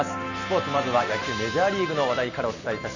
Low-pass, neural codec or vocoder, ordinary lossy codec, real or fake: 7.2 kHz; none; none; real